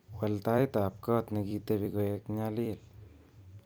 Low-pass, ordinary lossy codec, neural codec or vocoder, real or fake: none; none; vocoder, 44.1 kHz, 128 mel bands every 256 samples, BigVGAN v2; fake